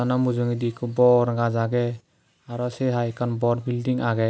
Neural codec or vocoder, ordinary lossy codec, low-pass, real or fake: none; none; none; real